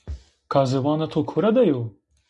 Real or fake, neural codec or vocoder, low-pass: real; none; 10.8 kHz